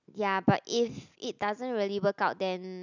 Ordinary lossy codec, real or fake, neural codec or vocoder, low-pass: none; real; none; 7.2 kHz